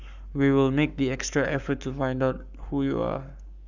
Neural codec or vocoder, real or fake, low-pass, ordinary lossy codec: codec, 44.1 kHz, 7.8 kbps, Pupu-Codec; fake; 7.2 kHz; none